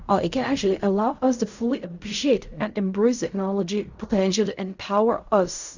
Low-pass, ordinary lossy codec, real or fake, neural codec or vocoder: 7.2 kHz; Opus, 64 kbps; fake; codec, 16 kHz in and 24 kHz out, 0.4 kbps, LongCat-Audio-Codec, fine tuned four codebook decoder